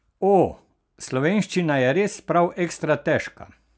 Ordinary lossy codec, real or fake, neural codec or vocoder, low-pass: none; real; none; none